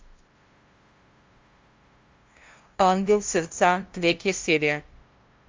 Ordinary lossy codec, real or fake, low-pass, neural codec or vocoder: Opus, 32 kbps; fake; 7.2 kHz; codec, 16 kHz, 0.5 kbps, FunCodec, trained on LibriTTS, 25 frames a second